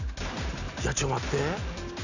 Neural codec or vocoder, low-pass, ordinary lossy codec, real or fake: none; 7.2 kHz; none; real